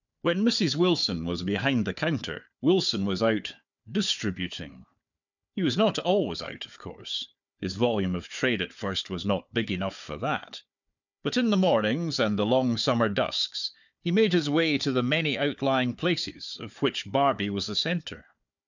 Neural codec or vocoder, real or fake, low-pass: codec, 16 kHz, 4 kbps, FunCodec, trained on Chinese and English, 50 frames a second; fake; 7.2 kHz